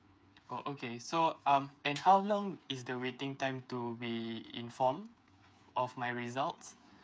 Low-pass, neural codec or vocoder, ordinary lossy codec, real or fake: none; codec, 16 kHz, 8 kbps, FreqCodec, smaller model; none; fake